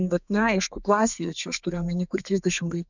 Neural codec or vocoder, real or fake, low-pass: codec, 32 kHz, 1.9 kbps, SNAC; fake; 7.2 kHz